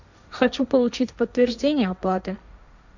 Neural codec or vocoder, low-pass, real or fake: codec, 16 kHz, 1.1 kbps, Voila-Tokenizer; 7.2 kHz; fake